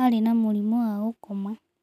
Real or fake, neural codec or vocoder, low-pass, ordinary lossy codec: real; none; 14.4 kHz; none